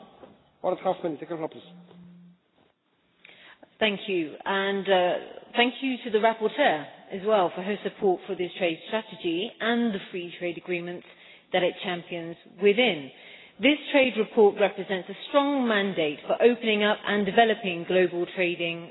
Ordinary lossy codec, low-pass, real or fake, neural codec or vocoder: AAC, 16 kbps; 7.2 kHz; real; none